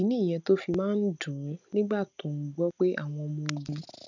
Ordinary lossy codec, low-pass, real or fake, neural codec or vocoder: none; 7.2 kHz; real; none